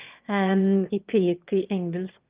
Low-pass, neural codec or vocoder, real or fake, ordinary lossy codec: 3.6 kHz; autoencoder, 22.05 kHz, a latent of 192 numbers a frame, VITS, trained on one speaker; fake; Opus, 32 kbps